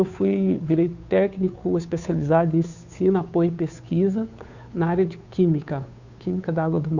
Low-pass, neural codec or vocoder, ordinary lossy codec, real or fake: 7.2 kHz; codec, 16 kHz, 2 kbps, FunCodec, trained on Chinese and English, 25 frames a second; Opus, 64 kbps; fake